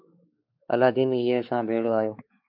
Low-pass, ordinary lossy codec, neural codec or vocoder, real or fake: 5.4 kHz; AAC, 48 kbps; codec, 16 kHz, 4 kbps, X-Codec, WavLM features, trained on Multilingual LibriSpeech; fake